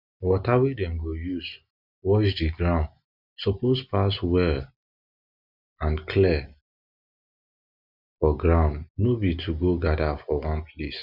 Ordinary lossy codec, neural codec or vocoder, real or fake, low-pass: none; none; real; 5.4 kHz